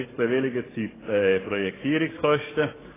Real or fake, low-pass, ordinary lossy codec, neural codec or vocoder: fake; 3.6 kHz; AAC, 16 kbps; codec, 44.1 kHz, 7.8 kbps, DAC